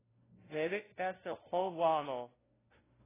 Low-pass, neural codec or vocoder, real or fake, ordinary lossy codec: 3.6 kHz; codec, 16 kHz, 0.5 kbps, FunCodec, trained on LibriTTS, 25 frames a second; fake; AAC, 16 kbps